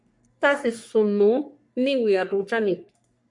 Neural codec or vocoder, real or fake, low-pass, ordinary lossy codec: codec, 44.1 kHz, 3.4 kbps, Pupu-Codec; fake; 10.8 kHz; MP3, 96 kbps